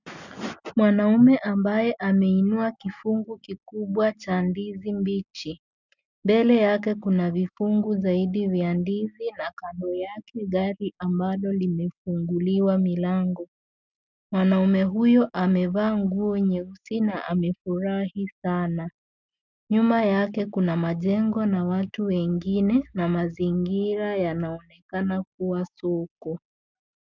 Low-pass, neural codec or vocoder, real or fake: 7.2 kHz; none; real